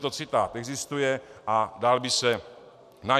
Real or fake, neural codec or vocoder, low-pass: real; none; 14.4 kHz